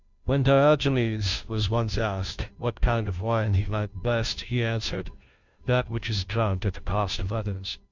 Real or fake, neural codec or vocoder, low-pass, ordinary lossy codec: fake; codec, 16 kHz, 0.5 kbps, FunCodec, trained on Chinese and English, 25 frames a second; 7.2 kHz; Opus, 64 kbps